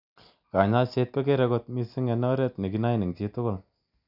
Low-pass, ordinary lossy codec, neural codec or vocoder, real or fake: 5.4 kHz; none; none; real